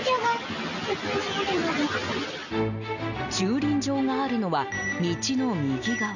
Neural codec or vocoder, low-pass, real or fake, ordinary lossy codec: none; 7.2 kHz; real; none